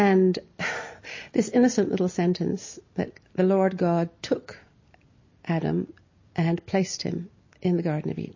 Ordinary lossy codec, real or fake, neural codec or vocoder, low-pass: MP3, 32 kbps; real; none; 7.2 kHz